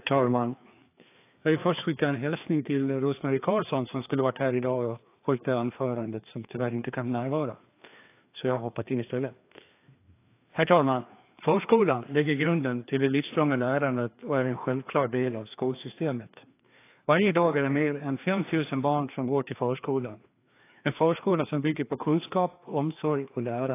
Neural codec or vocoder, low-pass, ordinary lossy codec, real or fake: codec, 16 kHz, 2 kbps, FreqCodec, larger model; 3.6 kHz; AAC, 24 kbps; fake